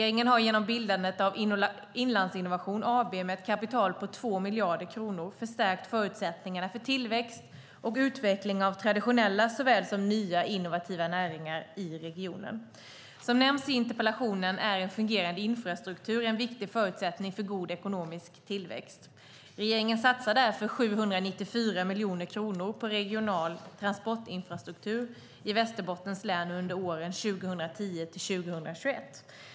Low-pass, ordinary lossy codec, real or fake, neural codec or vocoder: none; none; real; none